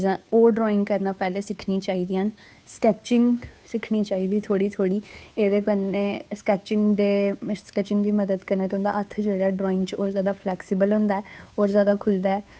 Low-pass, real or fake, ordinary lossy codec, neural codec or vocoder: none; fake; none; codec, 16 kHz, 2 kbps, FunCodec, trained on Chinese and English, 25 frames a second